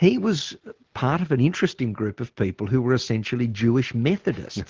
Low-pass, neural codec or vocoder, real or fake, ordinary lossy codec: 7.2 kHz; none; real; Opus, 16 kbps